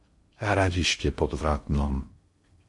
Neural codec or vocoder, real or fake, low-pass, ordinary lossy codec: codec, 16 kHz in and 24 kHz out, 0.6 kbps, FocalCodec, streaming, 2048 codes; fake; 10.8 kHz; MP3, 48 kbps